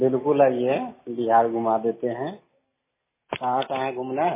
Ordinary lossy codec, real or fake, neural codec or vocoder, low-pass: MP3, 16 kbps; real; none; 3.6 kHz